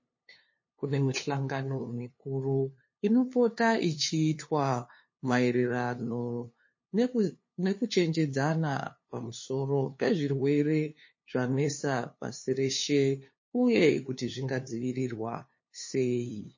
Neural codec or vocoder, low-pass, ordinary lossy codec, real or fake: codec, 16 kHz, 2 kbps, FunCodec, trained on LibriTTS, 25 frames a second; 7.2 kHz; MP3, 32 kbps; fake